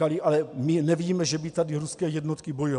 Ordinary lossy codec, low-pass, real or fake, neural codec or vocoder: MP3, 96 kbps; 10.8 kHz; real; none